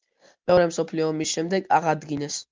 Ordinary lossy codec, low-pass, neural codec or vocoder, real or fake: Opus, 32 kbps; 7.2 kHz; none; real